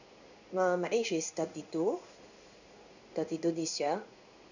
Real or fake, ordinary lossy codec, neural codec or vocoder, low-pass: fake; none; codec, 16 kHz in and 24 kHz out, 1 kbps, XY-Tokenizer; 7.2 kHz